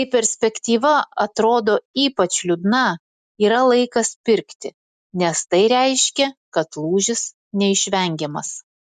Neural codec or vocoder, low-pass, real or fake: none; 14.4 kHz; real